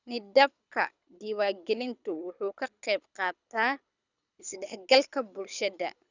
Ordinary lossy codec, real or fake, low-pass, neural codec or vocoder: none; fake; 7.2 kHz; codec, 24 kHz, 6 kbps, HILCodec